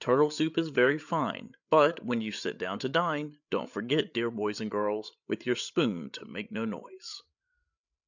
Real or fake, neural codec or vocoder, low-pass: fake; codec, 16 kHz, 8 kbps, FreqCodec, larger model; 7.2 kHz